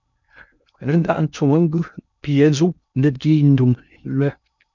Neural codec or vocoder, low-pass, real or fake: codec, 16 kHz in and 24 kHz out, 0.6 kbps, FocalCodec, streaming, 2048 codes; 7.2 kHz; fake